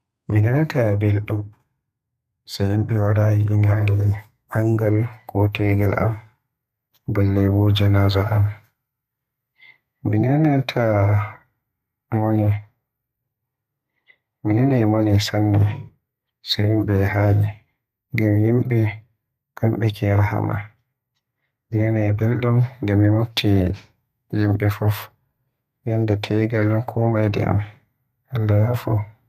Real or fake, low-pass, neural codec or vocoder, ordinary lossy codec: fake; 14.4 kHz; codec, 32 kHz, 1.9 kbps, SNAC; none